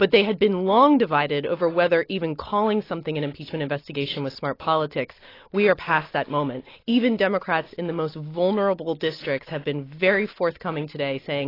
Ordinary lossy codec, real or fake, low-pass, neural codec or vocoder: AAC, 24 kbps; real; 5.4 kHz; none